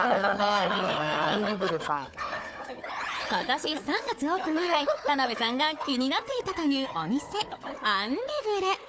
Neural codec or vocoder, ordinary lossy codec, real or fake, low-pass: codec, 16 kHz, 4 kbps, FunCodec, trained on Chinese and English, 50 frames a second; none; fake; none